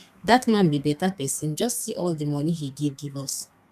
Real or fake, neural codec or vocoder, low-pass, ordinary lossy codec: fake; codec, 32 kHz, 1.9 kbps, SNAC; 14.4 kHz; none